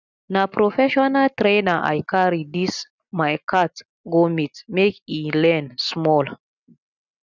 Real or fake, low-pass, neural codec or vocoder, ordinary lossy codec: real; 7.2 kHz; none; none